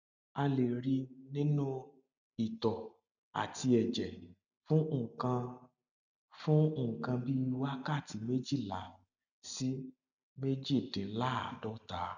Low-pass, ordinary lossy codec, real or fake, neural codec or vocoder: 7.2 kHz; none; real; none